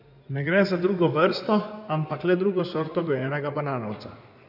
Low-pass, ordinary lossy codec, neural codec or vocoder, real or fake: 5.4 kHz; none; codec, 16 kHz in and 24 kHz out, 2.2 kbps, FireRedTTS-2 codec; fake